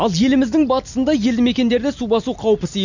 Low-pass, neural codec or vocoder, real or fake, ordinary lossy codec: 7.2 kHz; none; real; none